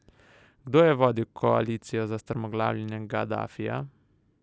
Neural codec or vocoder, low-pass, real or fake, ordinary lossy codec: none; none; real; none